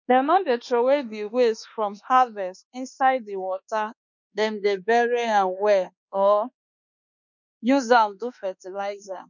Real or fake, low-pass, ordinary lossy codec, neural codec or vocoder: fake; 7.2 kHz; none; codec, 24 kHz, 1.2 kbps, DualCodec